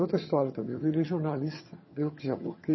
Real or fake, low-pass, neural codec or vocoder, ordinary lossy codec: fake; 7.2 kHz; vocoder, 22.05 kHz, 80 mel bands, HiFi-GAN; MP3, 24 kbps